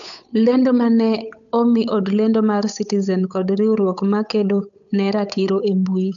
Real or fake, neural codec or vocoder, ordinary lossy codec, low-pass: fake; codec, 16 kHz, 8 kbps, FunCodec, trained on Chinese and English, 25 frames a second; none; 7.2 kHz